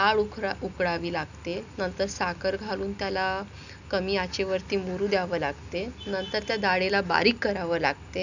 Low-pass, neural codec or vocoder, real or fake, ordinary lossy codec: 7.2 kHz; none; real; none